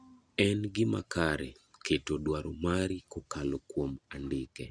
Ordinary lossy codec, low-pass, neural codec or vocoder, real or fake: Opus, 64 kbps; 9.9 kHz; none; real